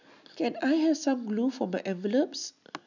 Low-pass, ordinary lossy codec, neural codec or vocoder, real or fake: 7.2 kHz; none; none; real